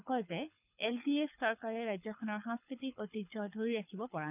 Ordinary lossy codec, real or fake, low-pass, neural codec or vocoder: none; fake; 3.6 kHz; codec, 24 kHz, 6 kbps, HILCodec